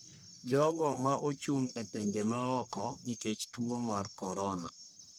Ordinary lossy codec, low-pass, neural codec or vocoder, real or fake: none; none; codec, 44.1 kHz, 1.7 kbps, Pupu-Codec; fake